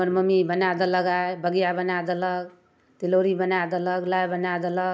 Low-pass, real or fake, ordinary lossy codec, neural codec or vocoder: none; real; none; none